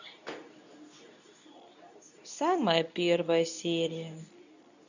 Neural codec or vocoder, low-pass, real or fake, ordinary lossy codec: codec, 24 kHz, 0.9 kbps, WavTokenizer, medium speech release version 2; 7.2 kHz; fake; none